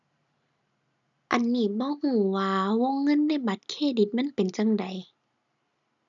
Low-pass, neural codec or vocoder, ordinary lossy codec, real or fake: 7.2 kHz; none; none; real